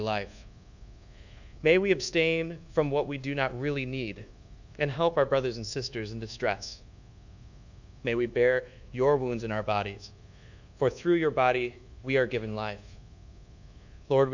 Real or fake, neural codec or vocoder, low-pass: fake; codec, 24 kHz, 1.2 kbps, DualCodec; 7.2 kHz